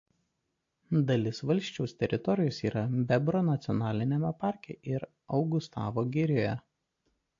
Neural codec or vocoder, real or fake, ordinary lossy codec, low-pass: none; real; AAC, 48 kbps; 7.2 kHz